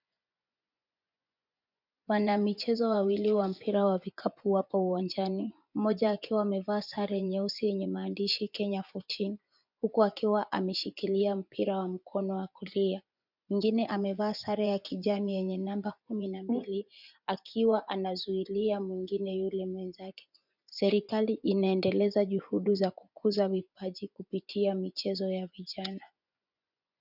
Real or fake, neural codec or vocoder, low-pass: fake; vocoder, 24 kHz, 100 mel bands, Vocos; 5.4 kHz